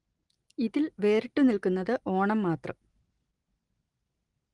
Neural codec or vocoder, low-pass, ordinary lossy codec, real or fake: none; 10.8 kHz; Opus, 24 kbps; real